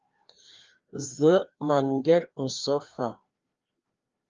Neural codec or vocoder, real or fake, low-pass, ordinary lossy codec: codec, 16 kHz, 2 kbps, FreqCodec, larger model; fake; 7.2 kHz; Opus, 32 kbps